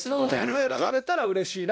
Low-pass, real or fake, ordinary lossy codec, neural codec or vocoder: none; fake; none; codec, 16 kHz, 1 kbps, X-Codec, WavLM features, trained on Multilingual LibriSpeech